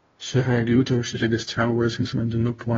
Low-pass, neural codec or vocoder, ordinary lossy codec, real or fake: 7.2 kHz; codec, 16 kHz, 0.5 kbps, FunCodec, trained on Chinese and English, 25 frames a second; AAC, 24 kbps; fake